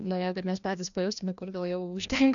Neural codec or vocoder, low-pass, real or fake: codec, 16 kHz, 1 kbps, FreqCodec, larger model; 7.2 kHz; fake